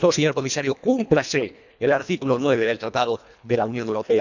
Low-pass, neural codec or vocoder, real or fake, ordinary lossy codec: 7.2 kHz; codec, 24 kHz, 1.5 kbps, HILCodec; fake; none